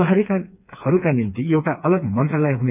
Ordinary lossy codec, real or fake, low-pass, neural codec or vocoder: none; fake; 3.6 kHz; codec, 16 kHz, 4 kbps, FreqCodec, smaller model